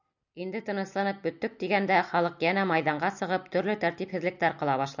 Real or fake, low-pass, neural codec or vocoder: real; 7.2 kHz; none